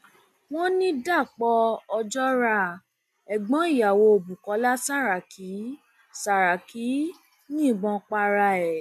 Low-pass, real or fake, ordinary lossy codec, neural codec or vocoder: 14.4 kHz; real; none; none